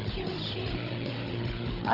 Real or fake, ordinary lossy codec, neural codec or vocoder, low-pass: fake; Opus, 24 kbps; codec, 16 kHz, 16 kbps, FunCodec, trained on Chinese and English, 50 frames a second; 5.4 kHz